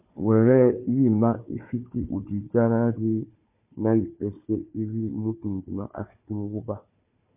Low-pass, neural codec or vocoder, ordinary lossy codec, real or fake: 3.6 kHz; codec, 16 kHz, 4 kbps, FunCodec, trained on Chinese and English, 50 frames a second; MP3, 32 kbps; fake